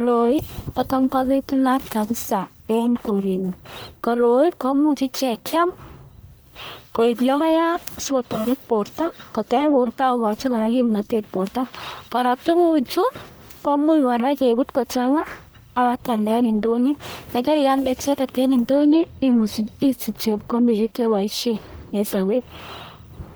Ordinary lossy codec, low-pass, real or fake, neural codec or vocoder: none; none; fake; codec, 44.1 kHz, 1.7 kbps, Pupu-Codec